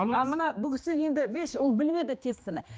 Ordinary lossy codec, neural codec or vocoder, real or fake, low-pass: none; codec, 16 kHz, 2 kbps, X-Codec, HuBERT features, trained on general audio; fake; none